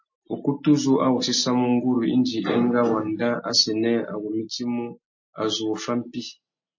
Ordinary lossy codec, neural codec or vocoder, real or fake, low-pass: MP3, 32 kbps; none; real; 7.2 kHz